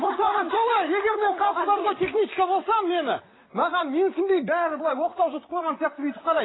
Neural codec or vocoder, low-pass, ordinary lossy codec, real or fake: vocoder, 44.1 kHz, 128 mel bands, Pupu-Vocoder; 7.2 kHz; AAC, 16 kbps; fake